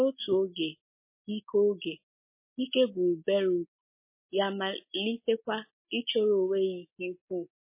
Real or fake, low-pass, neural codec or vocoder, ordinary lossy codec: real; 3.6 kHz; none; MP3, 24 kbps